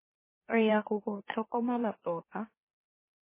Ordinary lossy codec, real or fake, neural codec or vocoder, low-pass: MP3, 16 kbps; fake; autoencoder, 44.1 kHz, a latent of 192 numbers a frame, MeloTTS; 3.6 kHz